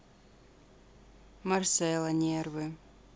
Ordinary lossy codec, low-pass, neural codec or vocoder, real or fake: none; none; none; real